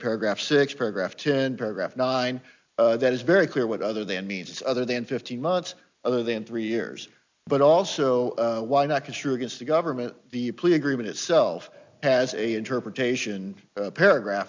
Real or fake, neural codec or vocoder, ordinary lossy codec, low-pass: real; none; MP3, 64 kbps; 7.2 kHz